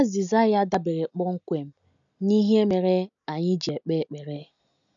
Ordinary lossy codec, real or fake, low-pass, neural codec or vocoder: none; real; 7.2 kHz; none